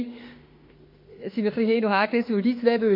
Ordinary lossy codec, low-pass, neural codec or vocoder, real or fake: MP3, 32 kbps; 5.4 kHz; autoencoder, 48 kHz, 32 numbers a frame, DAC-VAE, trained on Japanese speech; fake